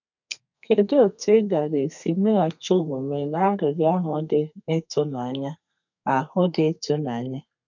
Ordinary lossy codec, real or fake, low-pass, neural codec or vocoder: none; fake; 7.2 kHz; codec, 32 kHz, 1.9 kbps, SNAC